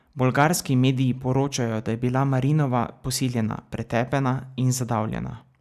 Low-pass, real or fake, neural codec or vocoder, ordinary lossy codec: 14.4 kHz; real; none; none